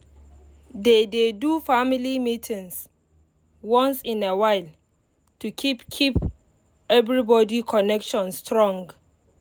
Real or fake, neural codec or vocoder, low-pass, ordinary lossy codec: real; none; none; none